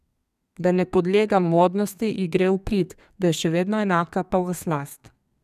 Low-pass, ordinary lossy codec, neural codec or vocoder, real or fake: 14.4 kHz; none; codec, 32 kHz, 1.9 kbps, SNAC; fake